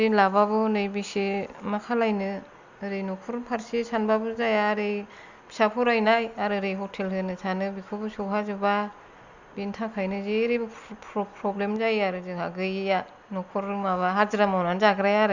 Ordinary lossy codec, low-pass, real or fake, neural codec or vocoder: Opus, 64 kbps; 7.2 kHz; real; none